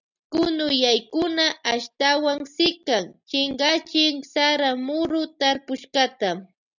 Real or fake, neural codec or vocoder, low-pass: real; none; 7.2 kHz